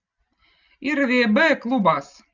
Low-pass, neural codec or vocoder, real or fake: 7.2 kHz; none; real